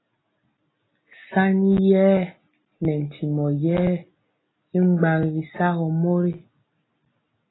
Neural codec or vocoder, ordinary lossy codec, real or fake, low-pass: none; AAC, 16 kbps; real; 7.2 kHz